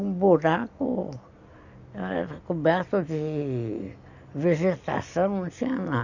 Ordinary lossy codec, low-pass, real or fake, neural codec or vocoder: none; 7.2 kHz; real; none